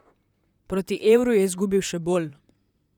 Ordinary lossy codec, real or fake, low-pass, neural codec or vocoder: none; fake; 19.8 kHz; vocoder, 44.1 kHz, 128 mel bands, Pupu-Vocoder